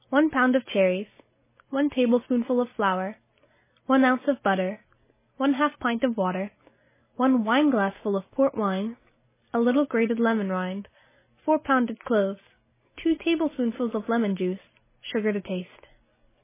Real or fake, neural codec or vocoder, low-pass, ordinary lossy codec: real; none; 3.6 kHz; MP3, 16 kbps